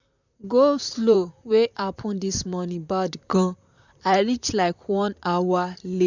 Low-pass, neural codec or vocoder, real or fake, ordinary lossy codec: 7.2 kHz; vocoder, 44.1 kHz, 128 mel bands, Pupu-Vocoder; fake; none